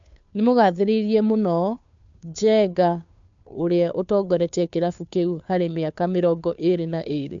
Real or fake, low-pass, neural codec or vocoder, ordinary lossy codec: fake; 7.2 kHz; codec, 16 kHz, 2 kbps, FunCodec, trained on Chinese and English, 25 frames a second; MP3, 64 kbps